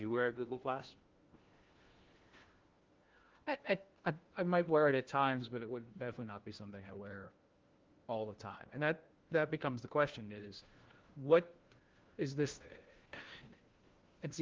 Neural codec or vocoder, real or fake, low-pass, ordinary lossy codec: codec, 16 kHz, 1 kbps, FunCodec, trained on LibriTTS, 50 frames a second; fake; 7.2 kHz; Opus, 16 kbps